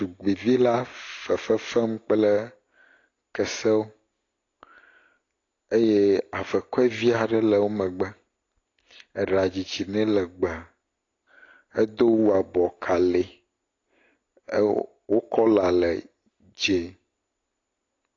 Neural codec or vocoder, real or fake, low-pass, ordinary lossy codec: none; real; 7.2 kHz; AAC, 32 kbps